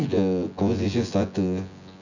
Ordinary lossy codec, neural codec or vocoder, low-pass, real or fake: none; vocoder, 24 kHz, 100 mel bands, Vocos; 7.2 kHz; fake